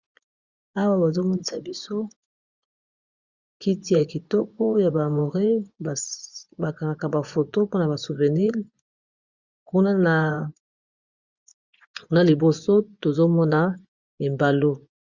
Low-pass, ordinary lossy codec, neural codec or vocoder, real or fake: 7.2 kHz; Opus, 64 kbps; vocoder, 24 kHz, 100 mel bands, Vocos; fake